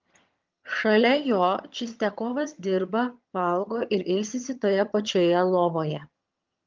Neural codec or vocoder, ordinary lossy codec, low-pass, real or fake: vocoder, 22.05 kHz, 80 mel bands, HiFi-GAN; Opus, 24 kbps; 7.2 kHz; fake